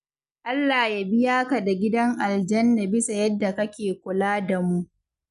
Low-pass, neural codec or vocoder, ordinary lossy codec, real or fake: 10.8 kHz; none; none; real